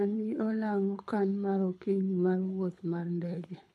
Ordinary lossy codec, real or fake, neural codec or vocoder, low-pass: none; fake; codec, 24 kHz, 6 kbps, HILCodec; none